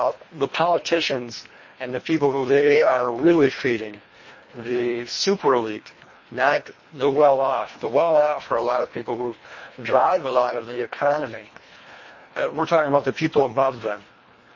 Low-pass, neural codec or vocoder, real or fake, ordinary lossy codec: 7.2 kHz; codec, 24 kHz, 1.5 kbps, HILCodec; fake; MP3, 32 kbps